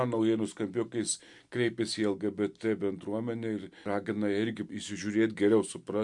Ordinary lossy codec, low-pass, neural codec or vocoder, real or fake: MP3, 64 kbps; 10.8 kHz; none; real